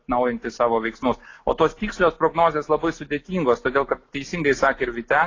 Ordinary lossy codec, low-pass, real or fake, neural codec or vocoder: AAC, 32 kbps; 7.2 kHz; real; none